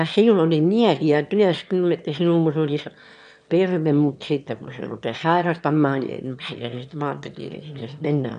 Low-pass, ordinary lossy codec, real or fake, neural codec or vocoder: 9.9 kHz; none; fake; autoencoder, 22.05 kHz, a latent of 192 numbers a frame, VITS, trained on one speaker